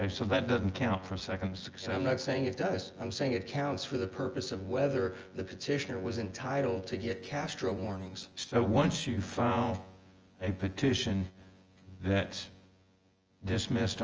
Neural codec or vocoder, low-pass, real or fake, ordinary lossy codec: vocoder, 24 kHz, 100 mel bands, Vocos; 7.2 kHz; fake; Opus, 24 kbps